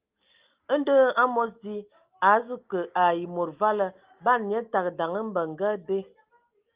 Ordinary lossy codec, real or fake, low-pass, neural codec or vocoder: Opus, 32 kbps; real; 3.6 kHz; none